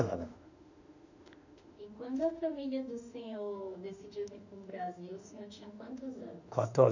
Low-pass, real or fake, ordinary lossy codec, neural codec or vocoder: 7.2 kHz; fake; none; autoencoder, 48 kHz, 32 numbers a frame, DAC-VAE, trained on Japanese speech